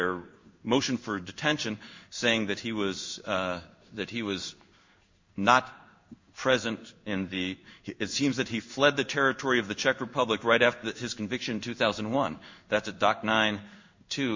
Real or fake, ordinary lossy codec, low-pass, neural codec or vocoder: fake; MP3, 48 kbps; 7.2 kHz; codec, 16 kHz in and 24 kHz out, 1 kbps, XY-Tokenizer